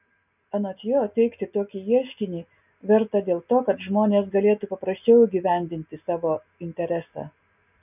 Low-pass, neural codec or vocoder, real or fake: 3.6 kHz; none; real